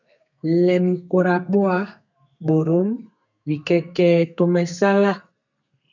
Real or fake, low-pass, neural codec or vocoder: fake; 7.2 kHz; codec, 32 kHz, 1.9 kbps, SNAC